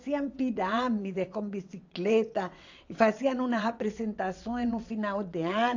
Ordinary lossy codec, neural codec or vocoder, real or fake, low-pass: AAC, 48 kbps; vocoder, 22.05 kHz, 80 mel bands, WaveNeXt; fake; 7.2 kHz